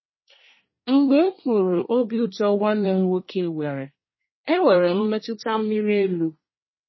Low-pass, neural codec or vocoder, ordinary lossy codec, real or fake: 7.2 kHz; codec, 24 kHz, 1 kbps, SNAC; MP3, 24 kbps; fake